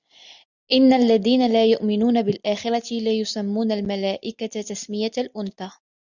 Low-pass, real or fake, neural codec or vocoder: 7.2 kHz; real; none